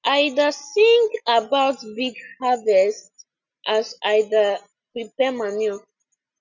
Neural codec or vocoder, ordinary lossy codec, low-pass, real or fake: none; none; 7.2 kHz; real